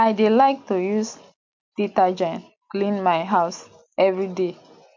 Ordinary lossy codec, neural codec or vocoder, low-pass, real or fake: MP3, 64 kbps; none; 7.2 kHz; real